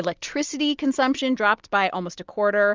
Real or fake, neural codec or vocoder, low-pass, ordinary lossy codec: real; none; 7.2 kHz; Opus, 32 kbps